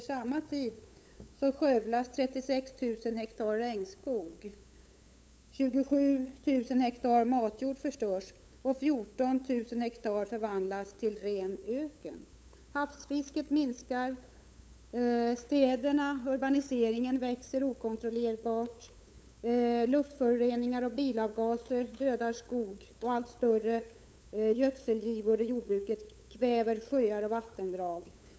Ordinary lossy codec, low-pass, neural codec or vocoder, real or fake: none; none; codec, 16 kHz, 8 kbps, FunCodec, trained on LibriTTS, 25 frames a second; fake